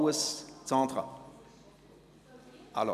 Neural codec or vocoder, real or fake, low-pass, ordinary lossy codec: none; real; 14.4 kHz; none